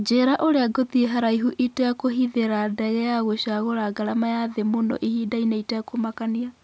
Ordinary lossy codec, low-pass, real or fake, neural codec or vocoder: none; none; real; none